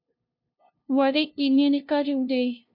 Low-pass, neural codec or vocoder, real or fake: 5.4 kHz; codec, 16 kHz, 0.5 kbps, FunCodec, trained on LibriTTS, 25 frames a second; fake